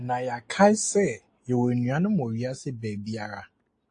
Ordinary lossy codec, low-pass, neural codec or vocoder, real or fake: AAC, 48 kbps; 9.9 kHz; none; real